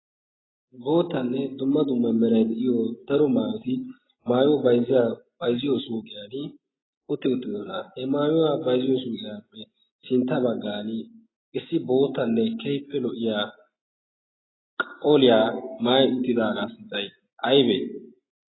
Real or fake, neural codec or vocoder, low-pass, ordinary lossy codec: real; none; 7.2 kHz; AAC, 16 kbps